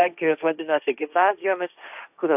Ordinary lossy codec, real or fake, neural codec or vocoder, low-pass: AAC, 32 kbps; fake; codec, 16 kHz, 1.1 kbps, Voila-Tokenizer; 3.6 kHz